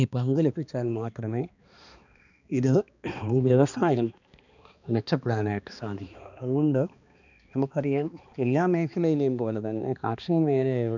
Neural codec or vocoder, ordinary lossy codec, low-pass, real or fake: codec, 16 kHz, 2 kbps, X-Codec, HuBERT features, trained on balanced general audio; none; 7.2 kHz; fake